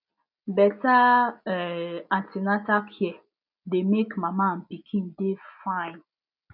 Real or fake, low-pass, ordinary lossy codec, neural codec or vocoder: real; 5.4 kHz; none; none